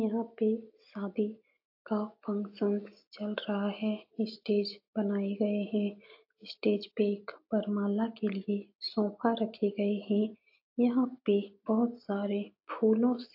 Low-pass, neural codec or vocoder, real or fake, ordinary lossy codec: 5.4 kHz; none; real; AAC, 48 kbps